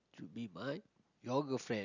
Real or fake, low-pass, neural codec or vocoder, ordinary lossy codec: real; 7.2 kHz; none; none